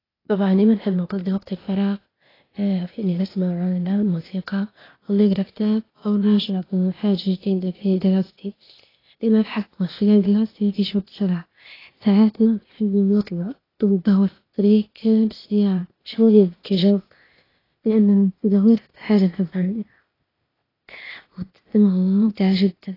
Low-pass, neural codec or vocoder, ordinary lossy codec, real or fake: 5.4 kHz; codec, 16 kHz, 0.8 kbps, ZipCodec; AAC, 24 kbps; fake